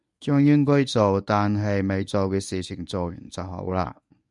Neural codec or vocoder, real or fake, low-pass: codec, 24 kHz, 0.9 kbps, WavTokenizer, medium speech release version 1; fake; 10.8 kHz